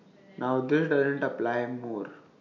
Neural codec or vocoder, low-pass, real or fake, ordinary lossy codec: none; 7.2 kHz; real; none